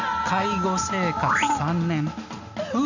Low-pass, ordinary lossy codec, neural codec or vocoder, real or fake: 7.2 kHz; none; none; real